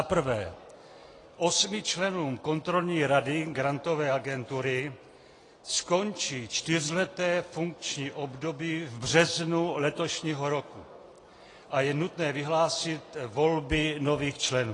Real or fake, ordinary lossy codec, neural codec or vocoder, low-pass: real; AAC, 32 kbps; none; 10.8 kHz